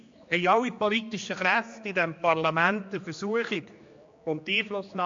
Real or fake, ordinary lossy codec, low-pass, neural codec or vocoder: fake; MP3, 48 kbps; 7.2 kHz; codec, 16 kHz, 2 kbps, X-Codec, HuBERT features, trained on general audio